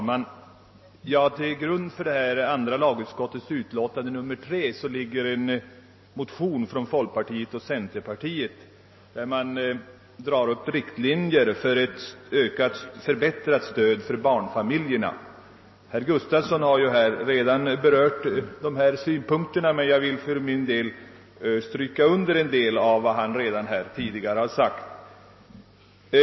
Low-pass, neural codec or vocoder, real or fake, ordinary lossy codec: 7.2 kHz; none; real; MP3, 24 kbps